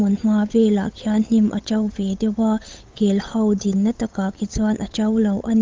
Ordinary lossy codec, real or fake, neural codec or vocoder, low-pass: Opus, 24 kbps; fake; codec, 16 kHz, 8 kbps, FunCodec, trained on Chinese and English, 25 frames a second; 7.2 kHz